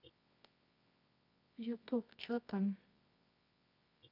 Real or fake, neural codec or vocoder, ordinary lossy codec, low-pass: fake; codec, 24 kHz, 0.9 kbps, WavTokenizer, medium music audio release; none; 5.4 kHz